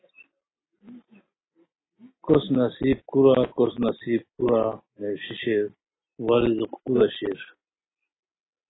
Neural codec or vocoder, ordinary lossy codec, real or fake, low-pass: none; AAC, 16 kbps; real; 7.2 kHz